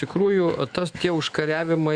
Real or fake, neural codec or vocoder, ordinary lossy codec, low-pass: fake; autoencoder, 48 kHz, 128 numbers a frame, DAC-VAE, trained on Japanese speech; Opus, 64 kbps; 9.9 kHz